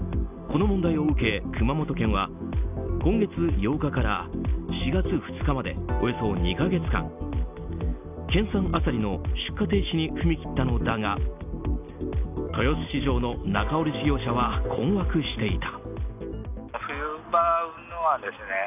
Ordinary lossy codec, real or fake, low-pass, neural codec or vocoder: none; real; 3.6 kHz; none